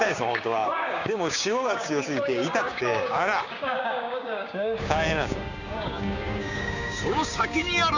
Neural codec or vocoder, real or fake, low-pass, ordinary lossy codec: codec, 16 kHz, 6 kbps, DAC; fake; 7.2 kHz; none